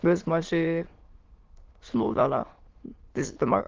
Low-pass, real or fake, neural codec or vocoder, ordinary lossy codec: 7.2 kHz; fake; autoencoder, 22.05 kHz, a latent of 192 numbers a frame, VITS, trained on many speakers; Opus, 16 kbps